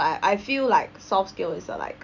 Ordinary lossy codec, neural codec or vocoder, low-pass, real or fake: none; none; 7.2 kHz; real